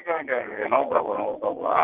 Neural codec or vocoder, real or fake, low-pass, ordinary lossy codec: vocoder, 22.05 kHz, 80 mel bands, WaveNeXt; fake; 3.6 kHz; Opus, 16 kbps